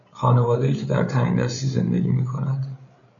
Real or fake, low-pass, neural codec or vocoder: fake; 7.2 kHz; codec, 16 kHz, 8 kbps, FreqCodec, larger model